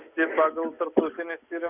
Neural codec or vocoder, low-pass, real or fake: none; 3.6 kHz; real